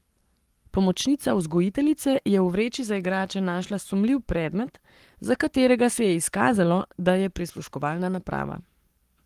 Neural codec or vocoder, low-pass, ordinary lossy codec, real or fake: codec, 44.1 kHz, 7.8 kbps, Pupu-Codec; 14.4 kHz; Opus, 24 kbps; fake